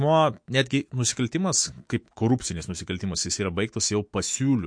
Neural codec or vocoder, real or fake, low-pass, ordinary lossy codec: autoencoder, 48 kHz, 128 numbers a frame, DAC-VAE, trained on Japanese speech; fake; 9.9 kHz; MP3, 48 kbps